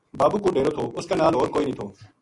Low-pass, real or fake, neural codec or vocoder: 10.8 kHz; real; none